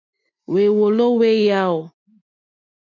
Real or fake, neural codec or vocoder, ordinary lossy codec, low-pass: real; none; MP3, 64 kbps; 7.2 kHz